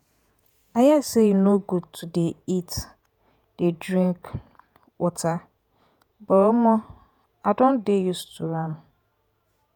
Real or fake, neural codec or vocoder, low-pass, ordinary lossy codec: fake; vocoder, 48 kHz, 128 mel bands, Vocos; 19.8 kHz; none